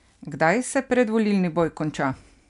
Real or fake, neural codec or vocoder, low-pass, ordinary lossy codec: real; none; 10.8 kHz; none